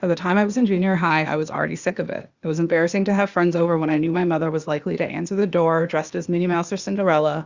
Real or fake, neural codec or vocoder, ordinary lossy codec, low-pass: fake; codec, 16 kHz, 0.8 kbps, ZipCodec; Opus, 64 kbps; 7.2 kHz